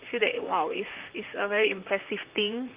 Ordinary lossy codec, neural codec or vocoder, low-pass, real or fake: Opus, 32 kbps; vocoder, 44.1 kHz, 128 mel bands, Pupu-Vocoder; 3.6 kHz; fake